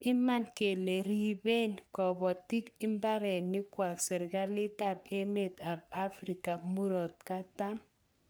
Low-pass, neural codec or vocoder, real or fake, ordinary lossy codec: none; codec, 44.1 kHz, 3.4 kbps, Pupu-Codec; fake; none